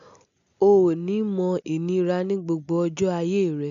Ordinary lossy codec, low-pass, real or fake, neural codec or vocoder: MP3, 96 kbps; 7.2 kHz; real; none